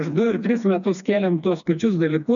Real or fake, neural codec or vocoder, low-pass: fake; codec, 16 kHz, 2 kbps, FreqCodec, smaller model; 7.2 kHz